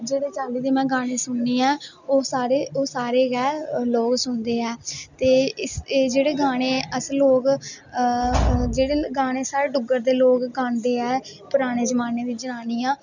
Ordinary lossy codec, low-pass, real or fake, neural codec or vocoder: none; 7.2 kHz; real; none